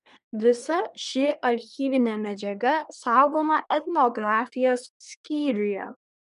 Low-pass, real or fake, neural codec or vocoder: 10.8 kHz; fake; codec, 24 kHz, 1 kbps, SNAC